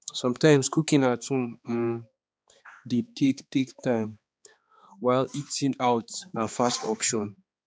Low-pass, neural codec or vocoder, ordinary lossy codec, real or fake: none; codec, 16 kHz, 2 kbps, X-Codec, HuBERT features, trained on balanced general audio; none; fake